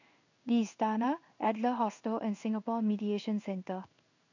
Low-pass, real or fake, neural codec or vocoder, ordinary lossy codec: 7.2 kHz; fake; codec, 16 kHz in and 24 kHz out, 1 kbps, XY-Tokenizer; none